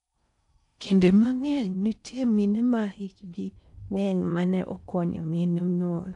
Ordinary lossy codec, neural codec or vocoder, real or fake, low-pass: none; codec, 16 kHz in and 24 kHz out, 0.6 kbps, FocalCodec, streaming, 4096 codes; fake; 10.8 kHz